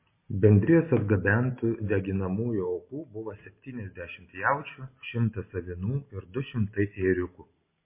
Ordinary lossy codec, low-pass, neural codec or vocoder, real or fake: MP3, 24 kbps; 3.6 kHz; none; real